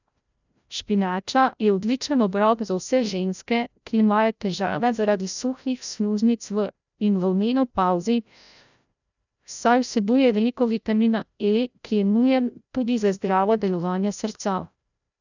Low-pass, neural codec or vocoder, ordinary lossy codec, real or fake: 7.2 kHz; codec, 16 kHz, 0.5 kbps, FreqCodec, larger model; none; fake